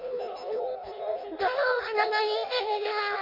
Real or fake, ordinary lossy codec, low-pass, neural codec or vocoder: fake; MP3, 48 kbps; 5.4 kHz; codec, 16 kHz in and 24 kHz out, 0.6 kbps, FireRedTTS-2 codec